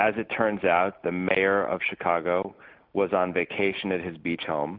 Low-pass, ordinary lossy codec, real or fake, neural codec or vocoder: 5.4 kHz; MP3, 48 kbps; real; none